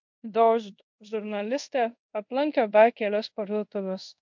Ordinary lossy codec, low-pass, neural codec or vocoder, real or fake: MP3, 48 kbps; 7.2 kHz; codec, 24 kHz, 0.5 kbps, DualCodec; fake